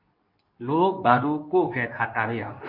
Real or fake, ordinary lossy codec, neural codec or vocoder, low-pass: fake; MP3, 32 kbps; codec, 24 kHz, 0.9 kbps, WavTokenizer, medium speech release version 2; 5.4 kHz